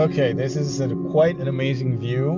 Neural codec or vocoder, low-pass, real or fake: none; 7.2 kHz; real